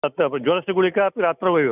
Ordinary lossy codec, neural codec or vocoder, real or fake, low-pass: none; none; real; 3.6 kHz